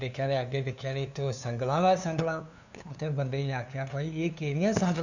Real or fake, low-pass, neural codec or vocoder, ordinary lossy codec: fake; 7.2 kHz; codec, 16 kHz, 2 kbps, FunCodec, trained on LibriTTS, 25 frames a second; none